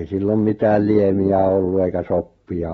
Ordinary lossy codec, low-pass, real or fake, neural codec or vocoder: AAC, 24 kbps; 19.8 kHz; real; none